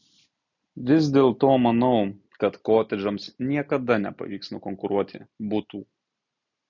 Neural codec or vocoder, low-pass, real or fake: none; 7.2 kHz; real